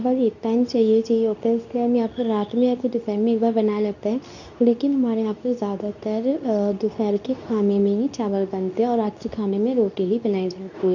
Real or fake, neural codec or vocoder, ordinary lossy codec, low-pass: fake; codec, 24 kHz, 0.9 kbps, WavTokenizer, medium speech release version 2; none; 7.2 kHz